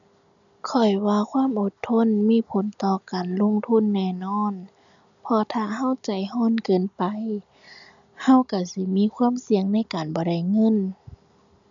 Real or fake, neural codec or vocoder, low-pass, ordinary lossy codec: real; none; 7.2 kHz; none